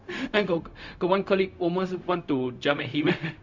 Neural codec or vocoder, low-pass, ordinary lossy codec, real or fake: codec, 16 kHz, 0.4 kbps, LongCat-Audio-Codec; 7.2 kHz; MP3, 64 kbps; fake